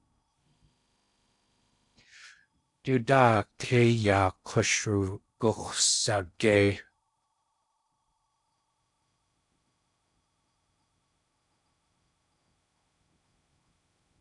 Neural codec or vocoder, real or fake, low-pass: codec, 16 kHz in and 24 kHz out, 0.6 kbps, FocalCodec, streaming, 4096 codes; fake; 10.8 kHz